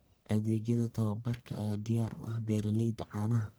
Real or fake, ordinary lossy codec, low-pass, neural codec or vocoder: fake; none; none; codec, 44.1 kHz, 1.7 kbps, Pupu-Codec